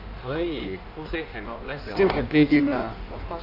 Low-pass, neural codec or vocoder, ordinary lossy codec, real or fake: 5.4 kHz; codec, 16 kHz in and 24 kHz out, 1.1 kbps, FireRedTTS-2 codec; none; fake